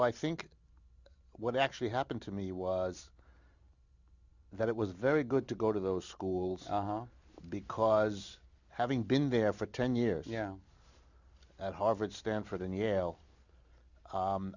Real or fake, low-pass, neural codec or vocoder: real; 7.2 kHz; none